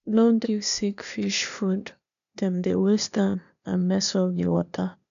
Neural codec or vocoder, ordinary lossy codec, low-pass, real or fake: codec, 16 kHz, 0.8 kbps, ZipCodec; none; 7.2 kHz; fake